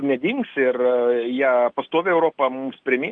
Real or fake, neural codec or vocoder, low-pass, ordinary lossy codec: real; none; 9.9 kHz; Opus, 24 kbps